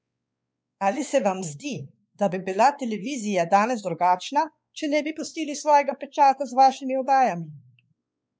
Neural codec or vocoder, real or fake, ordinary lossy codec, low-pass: codec, 16 kHz, 4 kbps, X-Codec, WavLM features, trained on Multilingual LibriSpeech; fake; none; none